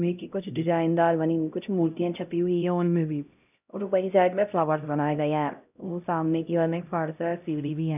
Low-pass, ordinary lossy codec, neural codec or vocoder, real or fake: 3.6 kHz; none; codec, 16 kHz, 0.5 kbps, X-Codec, HuBERT features, trained on LibriSpeech; fake